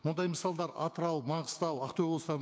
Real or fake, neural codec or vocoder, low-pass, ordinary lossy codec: fake; codec, 16 kHz, 6 kbps, DAC; none; none